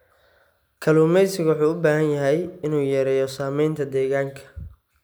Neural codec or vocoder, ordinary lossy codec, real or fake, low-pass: none; none; real; none